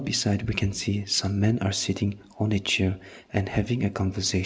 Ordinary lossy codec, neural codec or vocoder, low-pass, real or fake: none; none; none; real